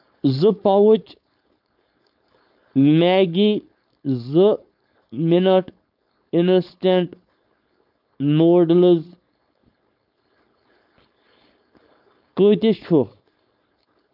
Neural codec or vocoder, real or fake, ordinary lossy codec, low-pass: codec, 16 kHz, 4.8 kbps, FACodec; fake; none; 5.4 kHz